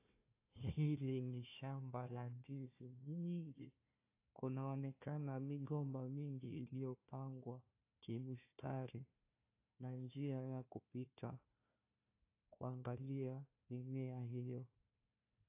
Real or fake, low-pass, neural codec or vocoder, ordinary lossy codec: fake; 3.6 kHz; codec, 16 kHz, 1 kbps, FunCodec, trained on Chinese and English, 50 frames a second; AAC, 24 kbps